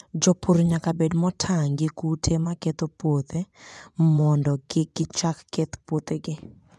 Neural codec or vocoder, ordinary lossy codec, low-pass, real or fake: vocoder, 24 kHz, 100 mel bands, Vocos; none; none; fake